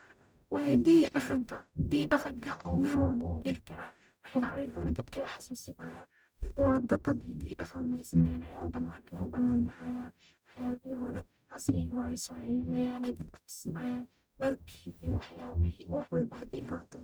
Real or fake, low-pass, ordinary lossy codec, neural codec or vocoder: fake; none; none; codec, 44.1 kHz, 0.9 kbps, DAC